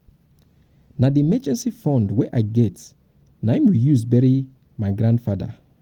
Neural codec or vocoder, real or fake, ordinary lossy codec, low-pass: none; real; Opus, 24 kbps; 19.8 kHz